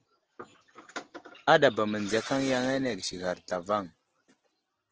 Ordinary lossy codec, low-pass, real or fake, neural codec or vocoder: Opus, 16 kbps; 7.2 kHz; real; none